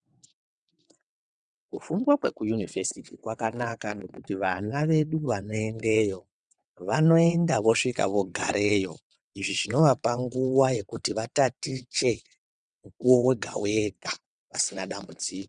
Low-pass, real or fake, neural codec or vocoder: 9.9 kHz; fake; vocoder, 22.05 kHz, 80 mel bands, WaveNeXt